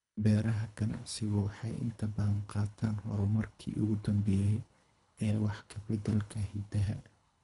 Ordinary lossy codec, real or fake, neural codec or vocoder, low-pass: none; fake; codec, 24 kHz, 3 kbps, HILCodec; 10.8 kHz